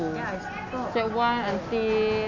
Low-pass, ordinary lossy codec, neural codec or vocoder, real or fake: 7.2 kHz; none; none; real